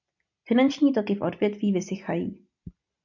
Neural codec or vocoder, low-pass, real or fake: none; 7.2 kHz; real